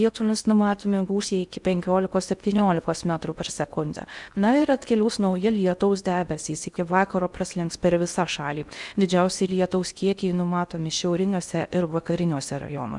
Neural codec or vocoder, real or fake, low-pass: codec, 16 kHz in and 24 kHz out, 0.6 kbps, FocalCodec, streaming, 2048 codes; fake; 10.8 kHz